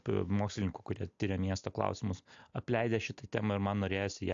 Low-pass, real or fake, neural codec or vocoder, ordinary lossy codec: 7.2 kHz; real; none; AAC, 64 kbps